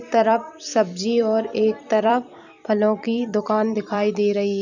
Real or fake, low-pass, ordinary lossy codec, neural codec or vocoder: fake; 7.2 kHz; none; vocoder, 44.1 kHz, 128 mel bands every 256 samples, BigVGAN v2